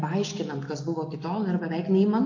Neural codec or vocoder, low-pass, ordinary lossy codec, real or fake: none; 7.2 kHz; AAC, 48 kbps; real